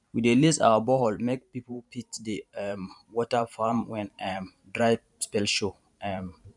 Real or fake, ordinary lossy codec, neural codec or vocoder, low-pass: fake; none; vocoder, 44.1 kHz, 128 mel bands every 512 samples, BigVGAN v2; 10.8 kHz